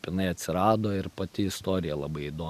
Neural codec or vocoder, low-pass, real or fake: none; 14.4 kHz; real